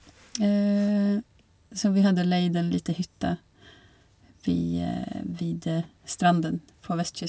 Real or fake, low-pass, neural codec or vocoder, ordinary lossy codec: real; none; none; none